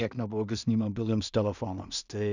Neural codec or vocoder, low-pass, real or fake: codec, 16 kHz in and 24 kHz out, 0.4 kbps, LongCat-Audio-Codec, two codebook decoder; 7.2 kHz; fake